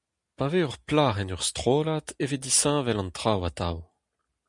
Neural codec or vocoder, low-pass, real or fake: none; 10.8 kHz; real